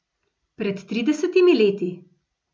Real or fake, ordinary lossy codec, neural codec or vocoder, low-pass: real; none; none; none